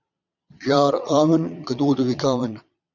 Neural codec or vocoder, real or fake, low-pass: vocoder, 22.05 kHz, 80 mel bands, Vocos; fake; 7.2 kHz